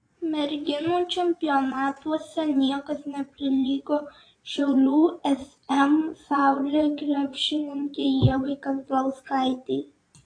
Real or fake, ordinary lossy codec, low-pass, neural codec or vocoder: fake; AAC, 48 kbps; 9.9 kHz; vocoder, 22.05 kHz, 80 mel bands, Vocos